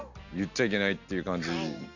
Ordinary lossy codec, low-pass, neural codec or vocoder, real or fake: none; 7.2 kHz; none; real